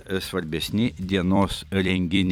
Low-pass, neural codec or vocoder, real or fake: 19.8 kHz; vocoder, 44.1 kHz, 128 mel bands every 256 samples, BigVGAN v2; fake